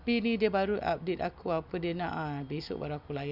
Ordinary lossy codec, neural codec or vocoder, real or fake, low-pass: none; none; real; 5.4 kHz